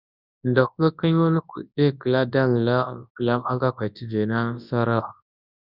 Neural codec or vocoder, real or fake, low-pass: codec, 24 kHz, 0.9 kbps, WavTokenizer, large speech release; fake; 5.4 kHz